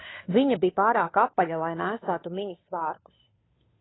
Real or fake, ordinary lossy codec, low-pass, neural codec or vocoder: fake; AAC, 16 kbps; 7.2 kHz; codec, 16 kHz, 2 kbps, FunCodec, trained on Chinese and English, 25 frames a second